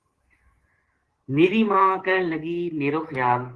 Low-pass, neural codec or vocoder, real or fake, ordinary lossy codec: 10.8 kHz; codec, 24 kHz, 3.1 kbps, DualCodec; fake; Opus, 16 kbps